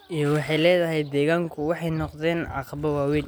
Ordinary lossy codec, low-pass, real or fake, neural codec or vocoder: none; none; real; none